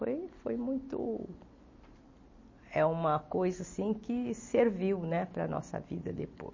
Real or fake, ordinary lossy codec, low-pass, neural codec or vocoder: real; MP3, 32 kbps; 7.2 kHz; none